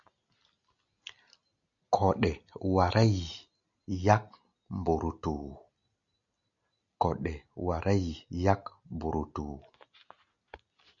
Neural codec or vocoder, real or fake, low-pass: none; real; 7.2 kHz